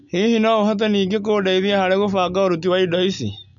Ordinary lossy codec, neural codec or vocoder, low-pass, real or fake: MP3, 64 kbps; none; 7.2 kHz; real